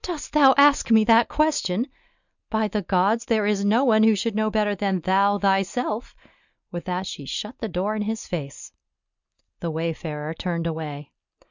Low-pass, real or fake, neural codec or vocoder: 7.2 kHz; real; none